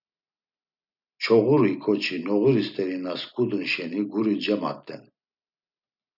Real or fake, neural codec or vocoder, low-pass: real; none; 5.4 kHz